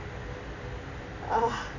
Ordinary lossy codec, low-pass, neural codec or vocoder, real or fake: none; 7.2 kHz; none; real